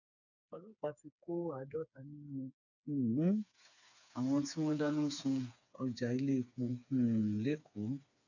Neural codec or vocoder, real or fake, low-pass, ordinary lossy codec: codec, 16 kHz, 4 kbps, FreqCodec, smaller model; fake; 7.2 kHz; none